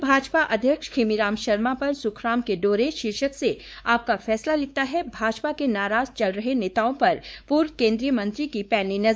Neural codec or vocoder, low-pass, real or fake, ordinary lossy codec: codec, 16 kHz, 4 kbps, X-Codec, WavLM features, trained on Multilingual LibriSpeech; none; fake; none